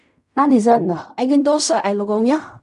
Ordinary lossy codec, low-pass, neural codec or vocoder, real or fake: none; 10.8 kHz; codec, 16 kHz in and 24 kHz out, 0.4 kbps, LongCat-Audio-Codec, fine tuned four codebook decoder; fake